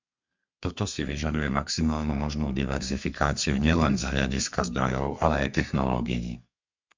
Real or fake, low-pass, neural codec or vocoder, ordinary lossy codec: fake; 7.2 kHz; codec, 32 kHz, 1.9 kbps, SNAC; MP3, 64 kbps